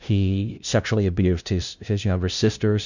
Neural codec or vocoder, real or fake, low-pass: codec, 16 kHz, 0.5 kbps, FunCodec, trained on LibriTTS, 25 frames a second; fake; 7.2 kHz